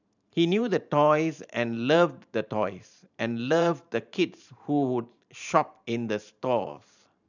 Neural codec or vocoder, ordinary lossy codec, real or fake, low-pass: vocoder, 44.1 kHz, 128 mel bands every 512 samples, BigVGAN v2; none; fake; 7.2 kHz